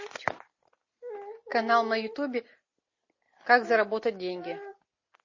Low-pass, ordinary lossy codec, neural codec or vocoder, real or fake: 7.2 kHz; MP3, 32 kbps; none; real